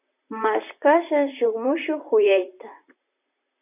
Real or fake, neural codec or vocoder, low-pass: fake; vocoder, 44.1 kHz, 128 mel bands, Pupu-Vocoder; 3.6 kHz